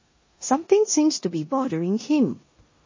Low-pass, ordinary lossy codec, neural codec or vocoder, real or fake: 7.2 kHz; MP3, 32 kbps; codec, 16 kHz in and 24 kHz out, 0.9 kbps, LongCat-Audio-Codec, four codebook decoder; fake